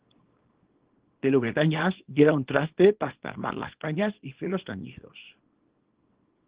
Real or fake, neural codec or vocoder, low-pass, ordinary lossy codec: fake; codec, 24 kHz, 0.9 kbps, WavTokenizer, small release; 3.6 kHz; Opus, 16 kbps